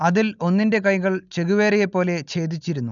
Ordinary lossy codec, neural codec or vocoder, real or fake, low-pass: none; none; real; 7.2 kHz